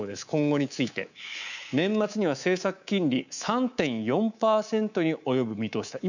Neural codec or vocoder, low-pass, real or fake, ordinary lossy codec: codec, 24 kHz, 3.1 kbps, DualCodec; 7.2 kHz; fake; none